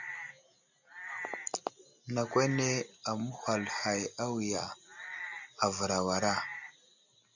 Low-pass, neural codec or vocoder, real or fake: 7.2 kHz; none; real